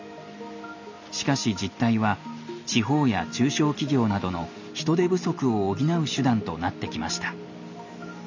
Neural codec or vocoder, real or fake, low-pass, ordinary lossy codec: none; real; 7.2 kHz; none